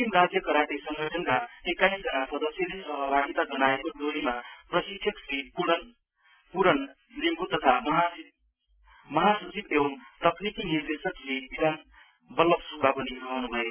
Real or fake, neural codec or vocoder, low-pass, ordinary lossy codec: real; none; 3.6 kHz; none